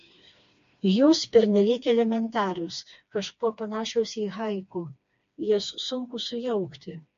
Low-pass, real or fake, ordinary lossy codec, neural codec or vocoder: 7.2 kHz; fake; MP3, 48 kbps; codec, 16 kHz, 2 kbps, FreqCodec, smaller model